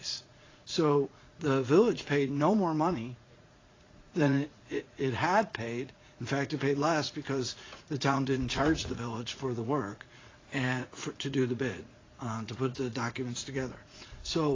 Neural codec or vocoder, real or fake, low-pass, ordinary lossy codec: vocoder, 44.1 kHz, 80 mel bands, Vocos; fake; 7.2 kHz; AAC, 32 kbps